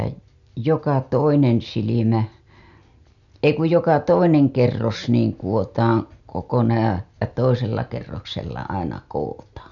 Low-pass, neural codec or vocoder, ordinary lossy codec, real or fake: 7.2 kHz; none; none; real